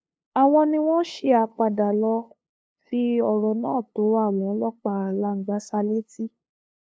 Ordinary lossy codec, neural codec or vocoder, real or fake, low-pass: none; codec, 16 kHz, 2 kbps, FunCodec, trained on LibriTTS, 25 frames a second; fake; none